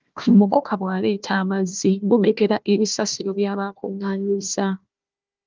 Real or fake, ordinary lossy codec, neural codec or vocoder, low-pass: fake; Opus, 24 kbps; codec, 16 kHz, 1 kbps, FunCodec, trained on Chinese and English, 50 frames a second; 7.2 kHz